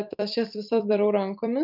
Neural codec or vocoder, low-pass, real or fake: vocoder, 44.1 kHz, 128 mel bands every 256 samples, BigVGAN v2; 5.4 kHz; fake